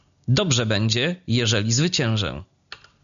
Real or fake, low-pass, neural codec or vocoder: real; 7.2 kHz; none